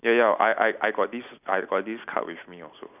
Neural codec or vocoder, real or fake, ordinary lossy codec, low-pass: none; real; none; 3.6 kHz